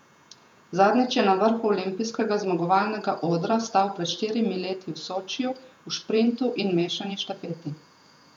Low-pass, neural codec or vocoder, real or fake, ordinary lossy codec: 19.8 kHz; vocoder, 48 kHz, 128 mel bands, Vocos; fake; none